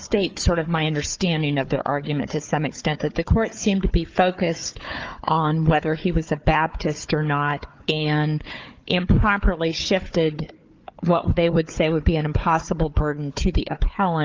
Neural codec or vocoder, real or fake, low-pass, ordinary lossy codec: codec, 16 kHz, 4 kbps, FunCodec, trained on Chinese and English, 50 frames a second; fake; 7.2 kHz; Opus, 24 kbps